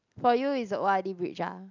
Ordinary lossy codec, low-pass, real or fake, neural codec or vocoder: none; 7.2 kHz; real; none